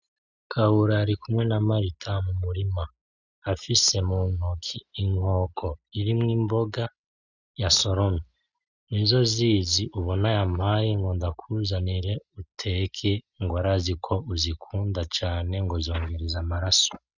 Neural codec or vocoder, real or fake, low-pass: none; real; 7.2 kHz